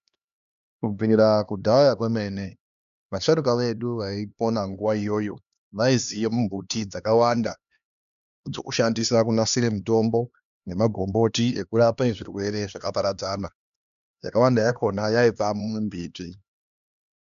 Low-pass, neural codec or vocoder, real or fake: 7.2 kHz; codec, 16 kHz, 2 kbps, X-Codec, HuBERT features, trained on LibriSpeech; fake